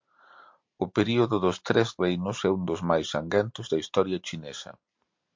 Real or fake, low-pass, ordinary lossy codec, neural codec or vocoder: real; 7.2 kHz; MP3, 48 kbps; none